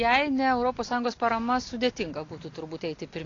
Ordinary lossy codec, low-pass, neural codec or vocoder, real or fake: AAC, 32 kbps; 7.2 kHz; none; real